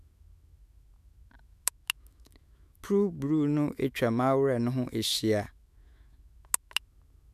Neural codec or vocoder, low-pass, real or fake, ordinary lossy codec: autoencoder, 48 kHz, 128 numbers a frame, DAC-VAE, trained on Japanese speech; 14.4 kHz; fake; none